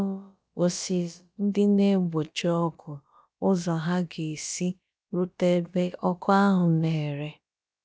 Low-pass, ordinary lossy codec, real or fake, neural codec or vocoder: none; none; fake; codec, 16 kHz, about 1 kbps, DyCAST, with the encoder's durations